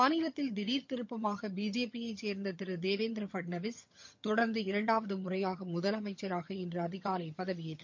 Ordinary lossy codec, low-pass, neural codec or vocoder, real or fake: MP3, 48 kbps; 7.2 kHz; vocoder, 22.05 kHz, 80 mel bands, HiFi-GAN; fake